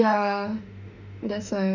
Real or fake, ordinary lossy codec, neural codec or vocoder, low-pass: fake; none; autoencoder, 48 kHz, 32 numbers a frame, DAC-VAE, trained on Japanese speech; 7.2 kHz